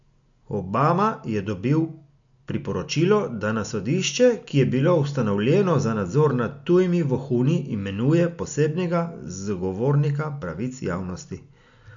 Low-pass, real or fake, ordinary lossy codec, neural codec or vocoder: 7.2 kHz; real; MP3, 64 kbps; none